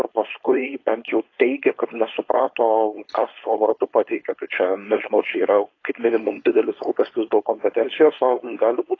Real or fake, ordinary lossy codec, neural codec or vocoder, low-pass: fake; AAC, 32 kbps; codec, 16 kHz, 4.8 kbps, FACodec; 7.2 kHz